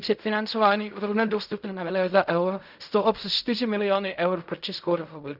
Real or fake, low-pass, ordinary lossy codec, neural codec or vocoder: fake; 5.4 kHz; none; codec, 16 kHz in and 24 kHz out, 0.4 kbps, LongCat-Audio-Codec, fine tuned four codebook decoder